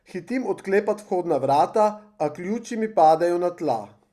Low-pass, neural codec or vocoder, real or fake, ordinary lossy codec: 14.4 kHz; none; real; Opus, 64 kbps